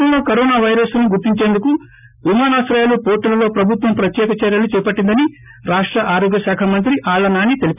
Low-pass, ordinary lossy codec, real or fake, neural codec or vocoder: 3.6 kHz; none; real; none